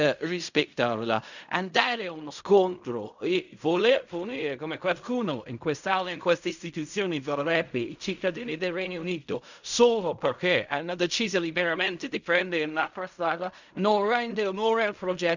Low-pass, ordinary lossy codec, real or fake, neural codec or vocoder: 7.2 kHz; none; fake; codec, 16 kHz in and 24 kHz out, 0.4 kbps, LongCat-Audio-Codec, fine tuned four codebook decoder